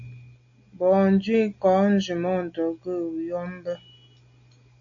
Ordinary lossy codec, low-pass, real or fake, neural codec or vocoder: AAC, 64 kbps; 7.2 kHz; real; none